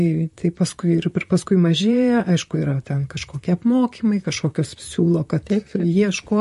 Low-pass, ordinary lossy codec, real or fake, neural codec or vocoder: 14.4 kHz; MP3, 48 kbps; fake; vocoder, 44.1 kHz, 128 mel bands, Pupu-Vocoder